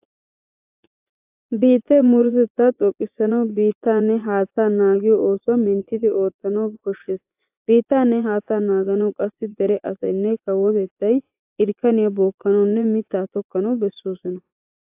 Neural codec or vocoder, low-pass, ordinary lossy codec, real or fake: vocoder, 24 kHz, 100 mel bands, Vocos; 3.6 kHz; AAC, 32 kbps; fake